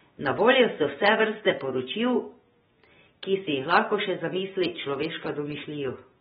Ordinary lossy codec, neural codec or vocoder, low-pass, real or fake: AAC, 16 kbps; none; 19.8 kHz; real